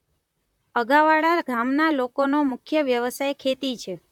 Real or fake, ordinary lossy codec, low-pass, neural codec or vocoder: fake; none; 19.8 kHz; vocoder, 44.1 kHz, 128 mel bands, Pupu-Vocoder